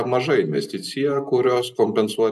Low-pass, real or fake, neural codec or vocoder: 14.4 kHz; real; none